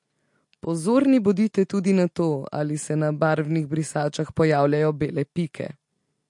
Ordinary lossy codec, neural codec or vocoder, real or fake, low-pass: MP3, 48 kbps; none; real; 10.8 kHz